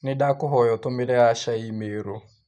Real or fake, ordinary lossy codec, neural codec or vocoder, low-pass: real; none; none; 9.9 kHz